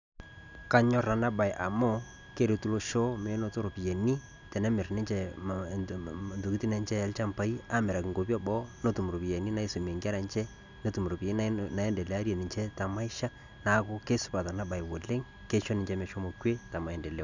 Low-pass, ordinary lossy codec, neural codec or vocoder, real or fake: 7.2 kHz; none; none; real